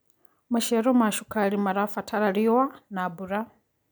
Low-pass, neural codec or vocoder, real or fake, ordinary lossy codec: none; none; real; none